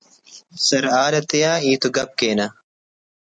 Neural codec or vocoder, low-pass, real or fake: none; 9.9 kHz; real